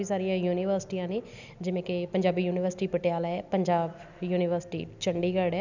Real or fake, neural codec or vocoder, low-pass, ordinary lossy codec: real; none; 7.2 kHz; none